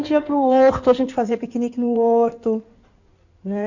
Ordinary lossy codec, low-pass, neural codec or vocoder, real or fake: none; 7.2 kHz; codec, 16 kHz in and 24 kHz out, 2.2 kbps, FireRedTTS-2 codec; fake